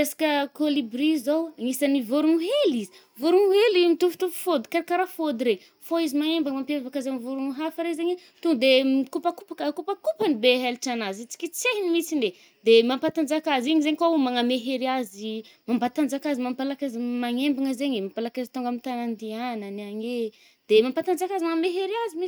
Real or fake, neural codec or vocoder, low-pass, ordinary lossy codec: real; none; none; none